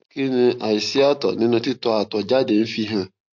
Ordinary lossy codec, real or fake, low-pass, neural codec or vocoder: MP3, 48 kbps; real; 7.2 kHz; none